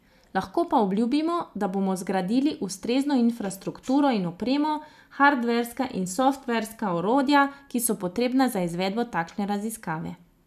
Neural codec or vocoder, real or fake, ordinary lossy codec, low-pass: none; real; none; 14.4 kHz